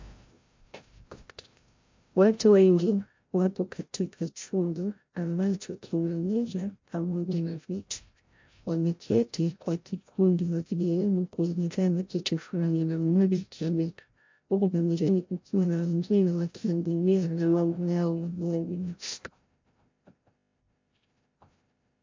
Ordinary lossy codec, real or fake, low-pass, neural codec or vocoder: MP3, 48 kbps; fake; 7.2 kHz; codec, 16 kHz, 0.5 kbps, FreqCodec, larger model